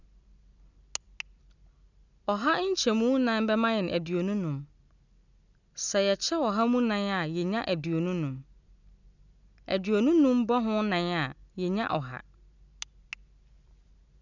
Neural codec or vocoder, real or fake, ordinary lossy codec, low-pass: none; real; none; 7.2 kHz